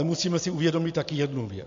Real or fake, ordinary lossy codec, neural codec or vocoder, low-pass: real; MP3, 48 kbps; none; 7.2 kHz